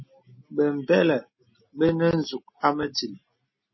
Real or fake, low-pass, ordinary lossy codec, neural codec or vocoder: real; 7.2 kHz; MP3, 24 kbps; none